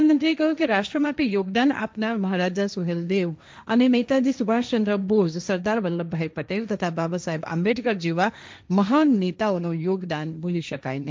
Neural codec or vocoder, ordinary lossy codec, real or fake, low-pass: codec, 16 kHz, 1.1 kbps, Voila-Tokenizer; none; fake; none